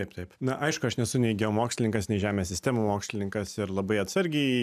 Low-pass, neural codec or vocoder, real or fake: 14.4 kHz; none; real